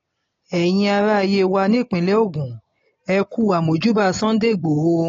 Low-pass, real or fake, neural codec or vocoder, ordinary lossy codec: 7.2 kHz; real; none; AAC, 32 kbps